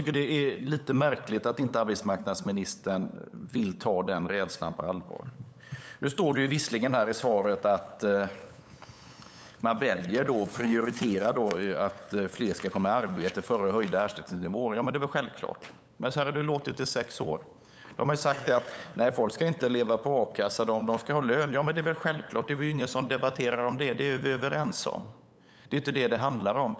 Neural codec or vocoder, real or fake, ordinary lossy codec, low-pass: codec, 16 kHz, 8 kbps, FunCodec, trained on LibriTTS, 25 frames a second; fake; none; none